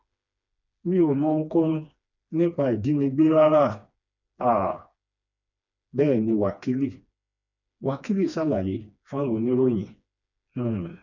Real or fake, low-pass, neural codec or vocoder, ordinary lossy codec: fake; 7.2 kHz; codec, 16 kHz, 2 kbps, FreqCodec, smaller model; none